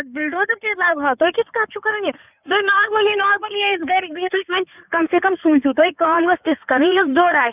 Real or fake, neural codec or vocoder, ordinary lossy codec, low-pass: fake; codec, 16 kHz in and 24 kHz out, 2.2 kbps, FireRedTTS-2 codec; none; 3.6 kHz